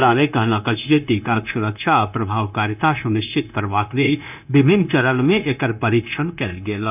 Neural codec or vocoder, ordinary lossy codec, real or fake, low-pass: codec, 16 kHz, 0.9 kbps, LongCat-Audio-Codec; none; fake; 3.6 kHz